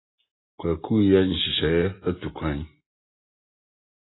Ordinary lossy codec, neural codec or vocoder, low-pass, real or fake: AAC, 16 kbps; none; 7.2 kHz; real